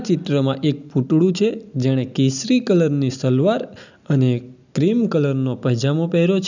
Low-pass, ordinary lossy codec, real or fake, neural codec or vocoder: 7.2 kHz; none; real; none